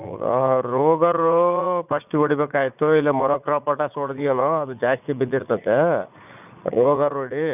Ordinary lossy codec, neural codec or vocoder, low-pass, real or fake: none; vocoder, 44.1 kHz, 80 mel bands, Vocos; 3.6 kHz; fake